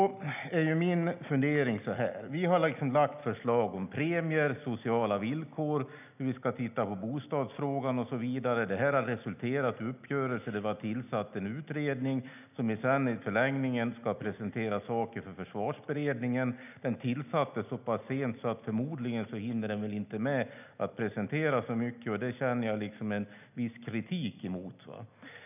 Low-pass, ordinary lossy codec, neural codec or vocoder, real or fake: 3.6 kHz; none; none; real